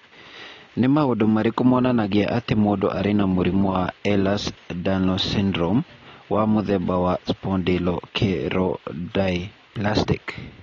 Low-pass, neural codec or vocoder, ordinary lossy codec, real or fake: 7.2 kHz; none; AAC, 32 kbps; real